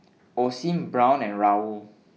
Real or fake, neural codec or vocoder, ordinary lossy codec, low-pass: real; none; none; none